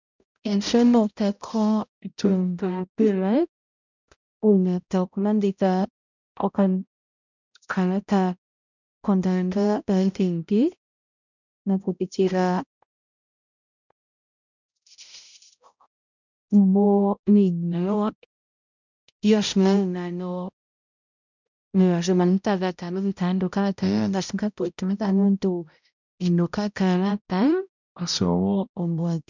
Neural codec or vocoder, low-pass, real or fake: codec, 16 kHz, 0.5 kbps, X-Codec, HuBERT features, trained on balanced general audio; 7.2 kHz; fake